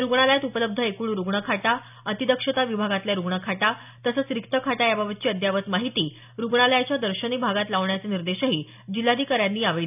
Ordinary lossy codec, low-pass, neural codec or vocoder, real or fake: none; 3.6 kHz; none; real